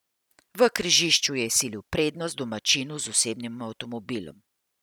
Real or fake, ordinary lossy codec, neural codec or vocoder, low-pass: real; none; none; none